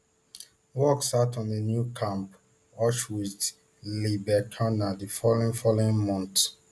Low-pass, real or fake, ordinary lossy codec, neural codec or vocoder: none; real; none; none